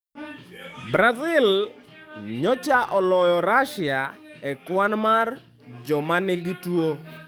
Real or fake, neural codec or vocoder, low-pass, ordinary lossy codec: fake; codec, 44.1 kHz, 7.8 kbps, DAC; none; none